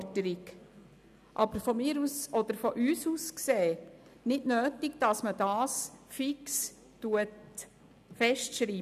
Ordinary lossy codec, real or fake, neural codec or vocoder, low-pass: none; real; none; 14.4 kHz